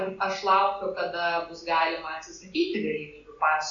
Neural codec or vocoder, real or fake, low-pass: none; real; 7.2 kHz